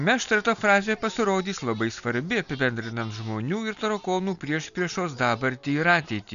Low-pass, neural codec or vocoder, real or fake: 7.2 kHz; none; real